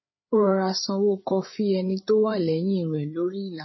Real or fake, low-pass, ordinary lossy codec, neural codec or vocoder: fake; 7.2 kHz; MP3, 24 kbps; codec, 16 kHz, 8 kbps, FreqCodec, larger model